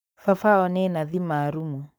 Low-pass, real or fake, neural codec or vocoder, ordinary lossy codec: none; fake; codec, 44.1 kHz, 7.8 kbps, Pupu-Codec; none